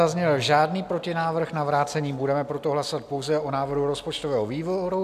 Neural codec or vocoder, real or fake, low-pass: none; real; 14.4 kHz